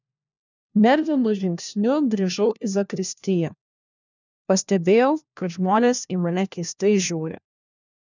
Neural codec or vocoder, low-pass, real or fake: codec, 16 kHz, 1 kbps, FunCodec, trained on LibriTTS, 50 frames a second; 7.2 kHz; fake